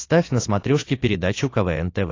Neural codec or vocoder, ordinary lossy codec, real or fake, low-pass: none; AAC, 32 kbps; real; 7.2 kHz